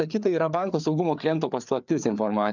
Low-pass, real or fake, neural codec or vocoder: 7.2 kHz; fake; codec, 16 kHz, 4 kbps, FreqCodec, larger model